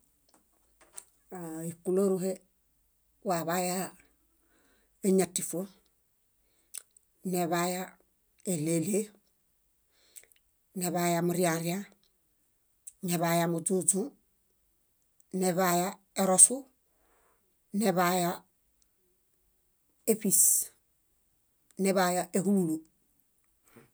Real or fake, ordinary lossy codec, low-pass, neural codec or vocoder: real; none; none; none